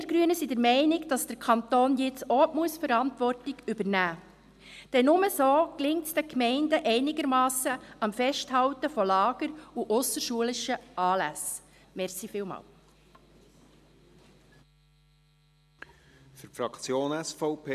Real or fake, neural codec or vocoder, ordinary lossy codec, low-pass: real; none; AAC, 96 kbps; 14.4 kHz